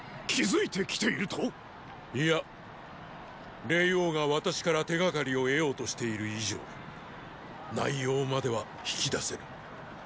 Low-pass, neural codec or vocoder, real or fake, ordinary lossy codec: none; none; real; none